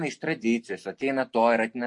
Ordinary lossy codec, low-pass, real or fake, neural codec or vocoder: MP3, 48 kbps; 10.8 kHz; real; none